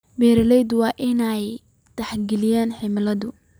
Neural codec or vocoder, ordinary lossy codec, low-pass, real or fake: none; none; none; real